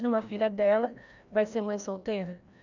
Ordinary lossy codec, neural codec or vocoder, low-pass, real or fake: none; codec, 16 kHz, 1 kbps, FreqCodec, larger model; 7.2 kHz; fake